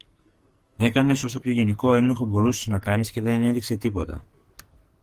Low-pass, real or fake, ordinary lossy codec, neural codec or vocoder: 14.4 kHz; fake; Opus, 24 kbps; codec, 44.1 kHz, 2.6 kbps, SNAC